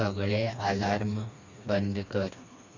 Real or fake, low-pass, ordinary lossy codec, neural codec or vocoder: fake; 7.2 kHz; MP3, 48 kbps; codec, 16 kHz, 2 kbps, FreqCodec, smaller model